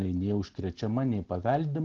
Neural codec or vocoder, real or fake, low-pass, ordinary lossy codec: none; real; 7.2 kHz; Opus, 16 kbps